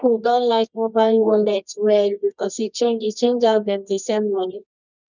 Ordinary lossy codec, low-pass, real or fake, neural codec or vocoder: none; 7.2 kHz; fake; codec, 24 kHz, 0.9 kbps, WavTokenizer, medium music audio release